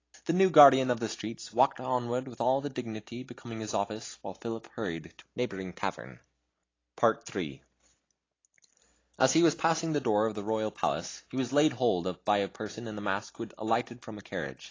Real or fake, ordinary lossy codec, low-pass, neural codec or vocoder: real; AAC, 32 kbps; 7.2 kHz; none